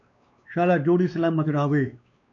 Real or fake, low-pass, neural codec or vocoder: fake; 7.2 kHz; codec, 16 kHz, 2 kbps, X-Codec, WavLM features, trained on Multilingual LibriSpeech